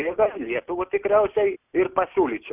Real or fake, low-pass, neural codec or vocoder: real; 3.6 kHz; none